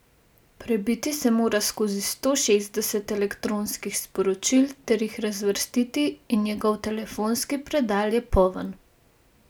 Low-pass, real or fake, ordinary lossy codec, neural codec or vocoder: none; real; none; none